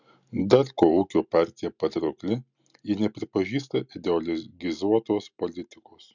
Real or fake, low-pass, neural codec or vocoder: real; 7.2 kHz; none